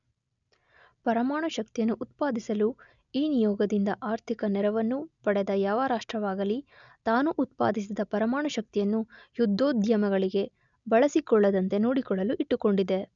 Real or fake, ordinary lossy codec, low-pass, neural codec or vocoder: real; none; 7.2 kHz; none